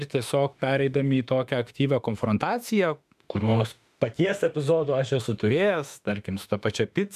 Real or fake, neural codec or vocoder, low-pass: fake; autoencoder, 48 kHz, 32 numbers a frame, DAC-VAE, trained on Japanese speech; 14.4 kHz